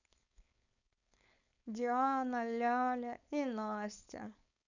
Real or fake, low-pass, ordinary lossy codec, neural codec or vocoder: fake; 7.2 kHz; none; codec, 16 kHz, 4.8 kbps, FACodec